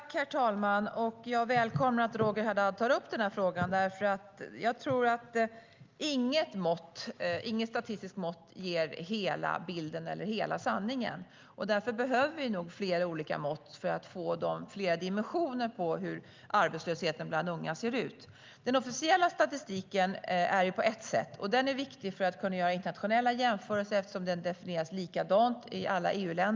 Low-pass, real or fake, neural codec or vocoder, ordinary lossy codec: 7.2 kHz; real; none; Opus, 24 kbps